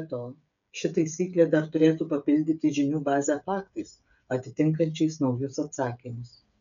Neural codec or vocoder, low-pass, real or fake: codec, 16 kHz, 8 kbps, FreqCodec, smaller model; 7.2 kHz; fake